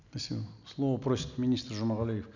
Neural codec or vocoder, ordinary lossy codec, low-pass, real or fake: none; none; 7.2 kHz; real